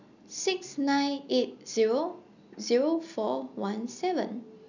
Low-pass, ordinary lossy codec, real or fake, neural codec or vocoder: 7.2 kHz; none; real; none